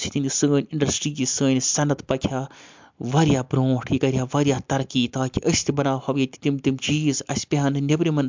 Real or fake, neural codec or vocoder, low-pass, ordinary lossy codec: real; none; 7.2 kHz; MP3, 64 kbps